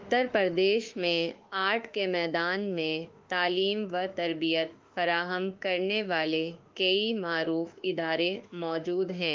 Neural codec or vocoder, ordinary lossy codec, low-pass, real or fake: autoencoder, 48 kHz, 32 numbers a frame, DAC-VAE, trained on Japanese speech; Opus, 32 kbps; 7.2 kHz; fake